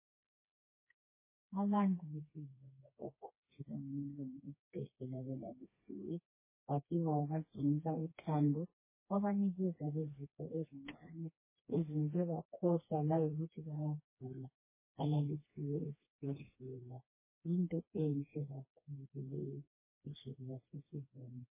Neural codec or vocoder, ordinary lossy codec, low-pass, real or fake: codec, 16 kHz, 2 kbps, FreqCodec, smaller model; MP3, 16 kbps; 3.6 kHz; fake